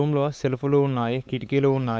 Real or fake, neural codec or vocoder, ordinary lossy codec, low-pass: fake; codec, 16 kHz, 4 kbps, X-Codec, WavLM features, trained on Multilingual LibriSpeech; none; none